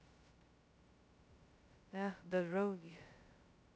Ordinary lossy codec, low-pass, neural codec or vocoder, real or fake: none; none; codec, 16 kHz, 0.2 kbps, FocalCodec; fake